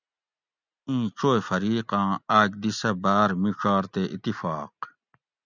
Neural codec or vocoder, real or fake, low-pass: none; real; 7.2 kHz